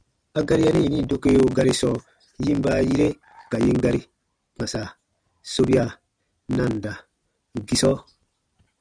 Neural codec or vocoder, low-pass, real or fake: none; 9.9 kHz; real